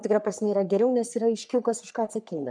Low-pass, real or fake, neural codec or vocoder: 9.9 kHz; fake; codec, 44.1 kHz, 3.4 kbps, Pupu-Codec